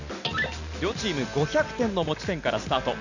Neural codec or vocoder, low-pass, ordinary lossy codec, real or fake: none; 7.2 kHz; none; real